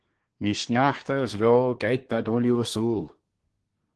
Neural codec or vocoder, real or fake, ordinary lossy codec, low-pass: codec, 24 kHz, 1 kbps, SNAC; fake; Opus, 16 kbps; 10.8 kHz